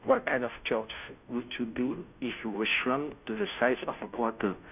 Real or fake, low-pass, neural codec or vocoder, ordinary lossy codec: fake; 3.6 kHz; codec, 16 kHz, 0.5 kbps, FunCodec, trained on Chinese and English, 25 frames a second; none